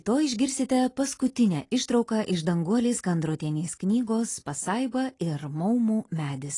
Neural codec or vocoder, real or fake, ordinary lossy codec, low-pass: none; real; AAC, 32 kbps; 10.8 kHz